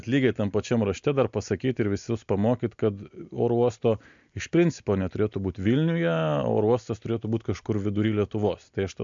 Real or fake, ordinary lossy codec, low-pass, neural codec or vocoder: real; MP3, 64 kbps; 7.2 kHz; none